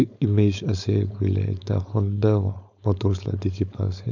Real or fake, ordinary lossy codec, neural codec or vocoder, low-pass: fake; none; codec, 16 kHz, 4.8 kbps, FACodec; 7.2 kHz